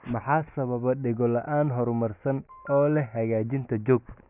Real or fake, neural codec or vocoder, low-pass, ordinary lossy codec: real; none; 3.6 kHz; none